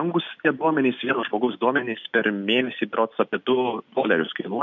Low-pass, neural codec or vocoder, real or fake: 7.2 kHz; none; real